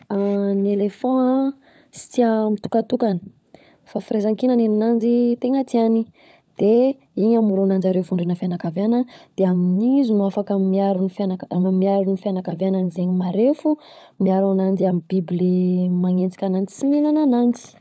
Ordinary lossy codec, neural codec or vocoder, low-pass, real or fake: none; codec, 16 kHz, 16 kbps, FunCodec, trained on LibriTTS, 50 frames a second; none; fake